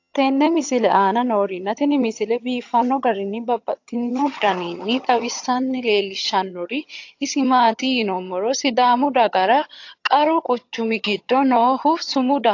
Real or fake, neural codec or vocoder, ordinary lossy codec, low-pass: fake; vocoder, 22.05 kHz, 80 mel bands, HiFi-GAN; AAC, 48 kbps; 7.2 kHz